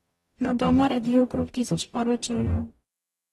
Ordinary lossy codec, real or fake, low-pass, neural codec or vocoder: AAC, 32 kbps; fake; 19.8 kHz; codec, 44.1 kHz, 0.9 kbps, DAC